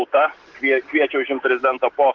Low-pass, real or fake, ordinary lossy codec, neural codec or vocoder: 7.2 kHz; real; Opus, 16 kbps; none